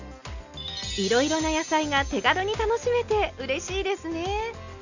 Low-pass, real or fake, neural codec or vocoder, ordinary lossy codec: 7.2 kHz; real; none; none